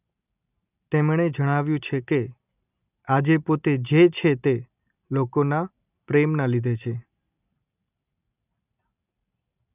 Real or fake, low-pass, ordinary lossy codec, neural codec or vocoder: real; 3.6 kHz; none; none